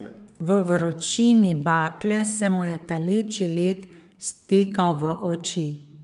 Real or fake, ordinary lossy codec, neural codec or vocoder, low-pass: fake; none; codec, 24 kHz, 1 kbps, SNAC; 10.8 kHz